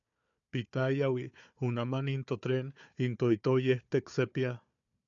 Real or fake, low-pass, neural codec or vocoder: fake; 7.2 kHz; codec, 16 kHz, 4 kbps, FunCodec, trained on Chinese and English, 50 frames a second